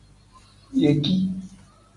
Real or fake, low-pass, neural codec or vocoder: real; 10.8 kHz; none